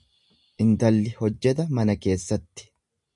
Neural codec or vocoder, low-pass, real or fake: none; 10.8 kHz; real